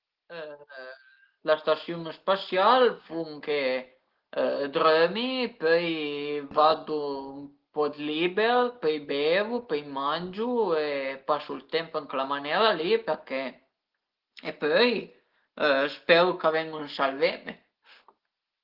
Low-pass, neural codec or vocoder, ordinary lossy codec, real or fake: 5.4 kHz; none; Opus, 16 kbps; real